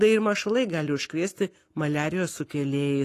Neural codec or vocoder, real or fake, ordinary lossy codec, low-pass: codec, 44.1 kHz, 7.8 kbps, Pupu-Codec; fake; AAC, 48 kbps; 14.4 kHz